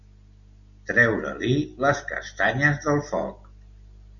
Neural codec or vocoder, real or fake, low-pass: none; real; 7.2 kHz